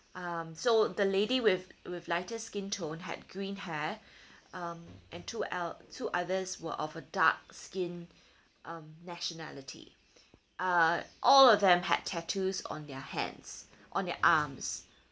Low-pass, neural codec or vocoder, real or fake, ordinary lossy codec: none; none; real; none